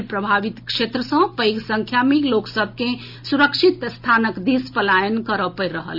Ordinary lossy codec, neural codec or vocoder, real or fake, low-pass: none; none; real; 5.4 kHz